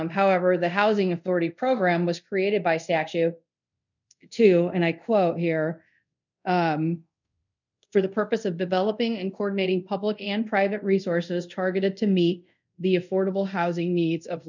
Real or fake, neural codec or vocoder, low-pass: fake; codec, 24 kHz, 0.5 kbps, DualCodec; 7.2 kHz